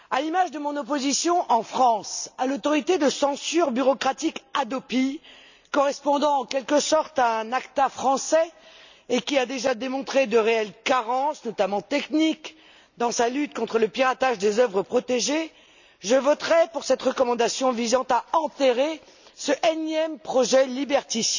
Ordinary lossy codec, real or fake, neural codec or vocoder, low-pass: none; real; none; 7.2 kHz